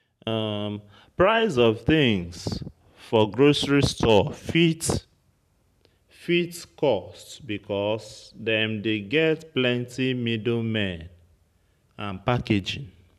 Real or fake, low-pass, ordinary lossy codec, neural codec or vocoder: fake; 14.4 kHz; none; vocoder, 44.1 kHz, 128 mel bands every 512 samples, BigVGAN v2